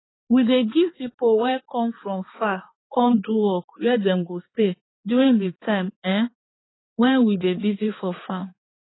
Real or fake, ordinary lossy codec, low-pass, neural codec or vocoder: fake; AAC, 16 kbps; 7.2 kHz; codec, 16 kHz, 4 kbps, X-Codec, HuBERT features, trained on balanced general audio